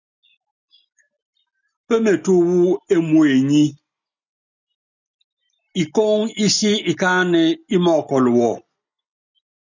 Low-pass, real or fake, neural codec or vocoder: 7.2 kHz; real; none